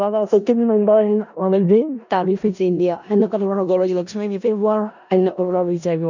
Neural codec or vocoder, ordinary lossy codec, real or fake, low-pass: codec, 16 kHz in and 24 kHz out, 0.4 kbps, LongCat-Audio-Codec, four codebook decoder; none; fake; 7.2 kHz